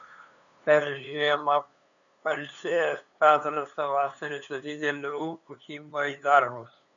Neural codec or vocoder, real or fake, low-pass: codec, 16 kHz, 2 kbps, FunCodec, trained on LibriTTS, 25 frames a second; fake; 7.2 kHz